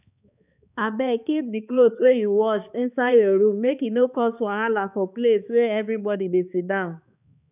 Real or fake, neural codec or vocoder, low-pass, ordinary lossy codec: fake; codec, 16 kHz, 2 kbps, X-Codec, HuBERT features, trained on balanced general audio; 3.6 kHz; none